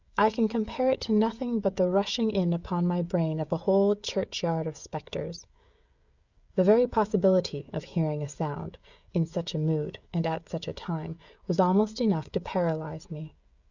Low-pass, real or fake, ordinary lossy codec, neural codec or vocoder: 7.2 kHz; fake; Opus, 64 kbps; codec, 16 kHz, 16 kbps, FreqCodec, smaller model